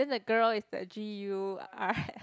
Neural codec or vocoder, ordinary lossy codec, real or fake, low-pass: none; none; real; none